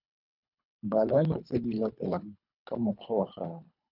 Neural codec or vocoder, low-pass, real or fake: codec, 24 kHz, 3 kbps, HILCodec; 5.4 kHz; fake